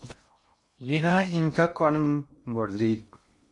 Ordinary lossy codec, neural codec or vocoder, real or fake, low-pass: MP3, 48 kbps; codec, 16 kHz in and 24 kHz out, 0.6 kbps, FocalCodec, streaming, 2048 codes; fake; 10.8 kHz